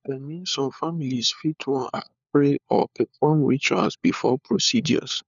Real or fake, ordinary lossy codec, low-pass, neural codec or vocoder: fake; none; 7.2 kHz; codec, 16 kHz, 4 kbps, FunCodec, trained on LibriTTS, 50 frames a second